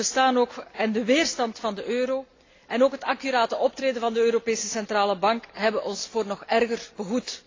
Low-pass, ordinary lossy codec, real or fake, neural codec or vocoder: 7.2 kHz; AAC, 32 kbps; real; none